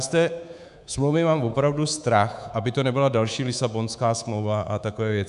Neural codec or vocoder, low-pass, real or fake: codec, 24 kHz, 3.1 kbps, DualCodec; 10.8 kHz; fake